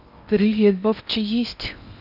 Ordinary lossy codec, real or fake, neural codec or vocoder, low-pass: none; fake; codec, 16 kHz in and 24 kHz out, 0.8 kbps, FocalCodec, streaming, 65536 codes; 5.4 kHz